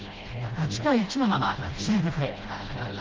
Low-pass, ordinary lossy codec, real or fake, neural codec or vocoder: 7.2 kHz; Opus, 16 kbps; fake; codec, 16 kHz, 0.5 kbps, FreqCodec, smaller model